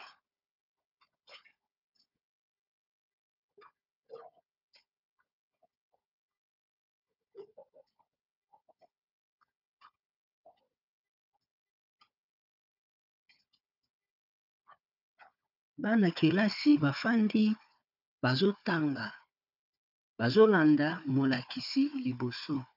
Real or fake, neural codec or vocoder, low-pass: fake; codec, 16 kHz, 4 kbps, FunCodec, trained on Chinese and English, 50 frames a second; 5.4 kHz